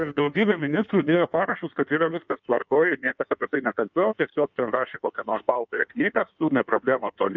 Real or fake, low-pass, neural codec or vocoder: fake; 7.2 kHz; codec, 16 kHz in and 24 kHz out, 1.1 kbps, FireRedTTS-2 codec